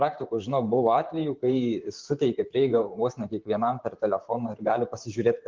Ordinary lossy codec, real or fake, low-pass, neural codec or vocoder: Opus, 32 kbps; fake; 7.2 kHz; vocoder, 44.1 kHz, 128 mel bands, Pupu-Vocoder